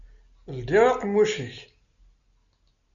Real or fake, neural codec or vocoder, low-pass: real; none; 7.2 kHz